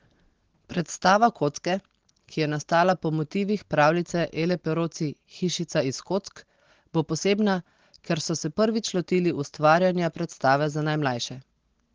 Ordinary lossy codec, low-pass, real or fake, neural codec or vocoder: Opus, 16 kbps; 7.2 kHz; real; none